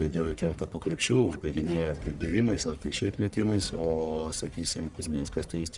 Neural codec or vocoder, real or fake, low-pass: codec, 44.1 kHz, 1.7 kbps, Pupu-Codec; fake; 10.8 kHz